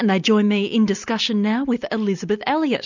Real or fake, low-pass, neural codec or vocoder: real; 7.2 kHz; none